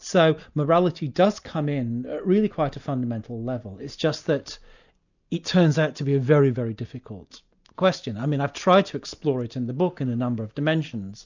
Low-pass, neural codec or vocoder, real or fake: 7.2 kHz; none; real